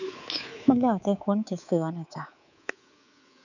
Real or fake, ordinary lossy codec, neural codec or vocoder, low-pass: fake; none; codec, 24 kHz, 3.1 kbps, DualCodec; 7.2 kHz